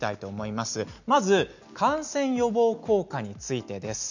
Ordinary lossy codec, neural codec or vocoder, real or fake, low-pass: none; none; real; 7.2 kHz